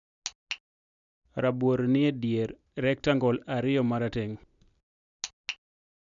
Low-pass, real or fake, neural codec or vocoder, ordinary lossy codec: 7.2 kHz; real; none; none